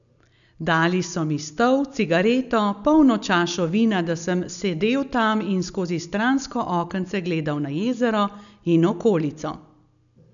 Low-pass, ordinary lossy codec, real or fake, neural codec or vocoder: 7.2 kHz; none; real; none